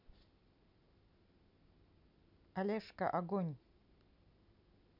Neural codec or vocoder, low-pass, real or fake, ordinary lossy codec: none; 5.4 kHz; real; none